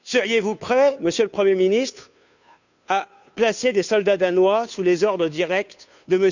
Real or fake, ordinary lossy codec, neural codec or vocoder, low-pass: fake; none; codec, 16 kHz, 2 kbps, FunCodec, trained on Chinese and English, 25 frames a second; 7.2 kHz